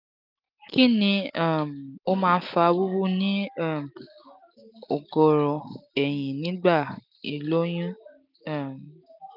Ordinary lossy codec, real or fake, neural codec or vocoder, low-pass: none; real; none; 5.4 kHz